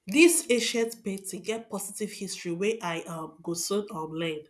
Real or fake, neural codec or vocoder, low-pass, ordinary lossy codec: real; none; none; none